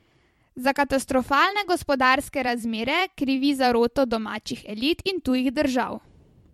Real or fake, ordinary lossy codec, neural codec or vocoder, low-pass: fake; MP3, 64 kbps; vocoder, 44.1 kHz, 128 mel bands every 256 samples, BigVGAN v2; 19.8 kHz